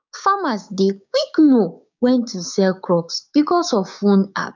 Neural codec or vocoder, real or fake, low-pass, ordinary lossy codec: codec, 16 kHz, 6 kbps, DAC; fake; 7.2 kHz; none